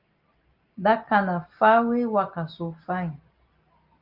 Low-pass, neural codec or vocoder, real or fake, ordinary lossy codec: 5.4 kHz; none; real; Opus, 24 kbps